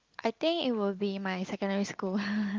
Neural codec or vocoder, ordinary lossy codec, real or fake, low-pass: none; Opus, 32 kbps; real; 7.2 kHz